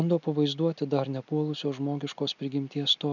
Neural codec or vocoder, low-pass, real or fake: none; 7.2 kHz; real